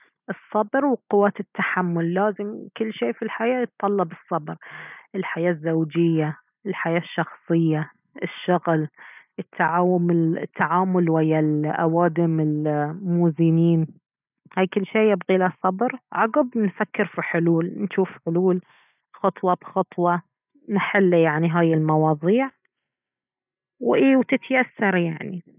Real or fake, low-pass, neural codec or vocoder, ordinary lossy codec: real; 3.6 kHz; none; none